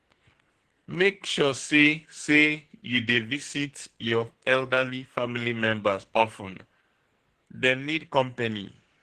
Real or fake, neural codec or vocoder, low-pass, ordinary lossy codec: fake; codec, 44.1 kHz, 2.6 kbps, SNAC; 14.4 kHz; Opus, 16 kbps